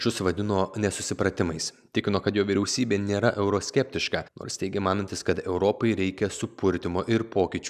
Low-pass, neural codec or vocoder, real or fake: 14.4 kHz; none; real